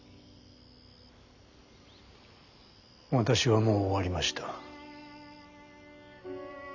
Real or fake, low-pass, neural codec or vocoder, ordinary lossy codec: real; 7.2 kHz; none; none